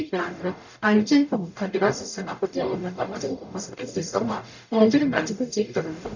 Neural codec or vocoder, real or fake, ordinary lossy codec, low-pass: codec, 44.1 kHz, 0.9 kbps, DAC; fake; none; 7.2 kHz